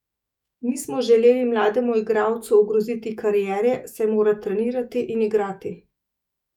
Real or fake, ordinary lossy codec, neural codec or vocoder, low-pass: fake; none; autoencoder, 48 kHz, 128 numbers a frame, DAC-VAE, trained on Japanese speech; 19.8 kHz